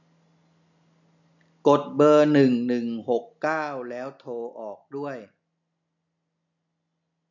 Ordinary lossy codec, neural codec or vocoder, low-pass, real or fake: none; none; 7.2 kHz; real